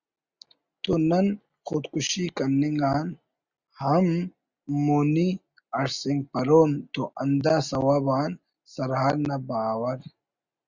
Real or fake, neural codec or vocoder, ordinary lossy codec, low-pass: real; none; Opus, 64 kbps; 7.2 kHz